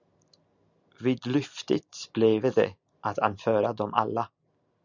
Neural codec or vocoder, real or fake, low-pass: none; real; 7.2 kHz